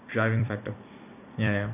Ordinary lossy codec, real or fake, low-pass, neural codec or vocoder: none; fake; 3.6 kHz; vocoder, 44.1 kHz, 128 mel bands every 256 samples, BigVGAN v2